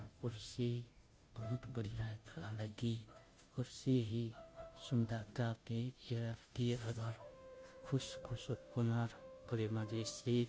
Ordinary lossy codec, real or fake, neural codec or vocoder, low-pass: none; fake; codec, 16 kHz, 0.5 kbps, FunCodec, trained on Chinese and English, 25 frames a second; none